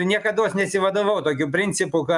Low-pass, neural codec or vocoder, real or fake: 10.8 kHz; none; real